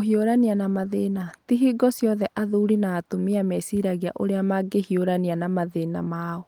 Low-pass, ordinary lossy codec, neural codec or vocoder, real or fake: 19.8 kHz; Opus, 24 kbps; none; real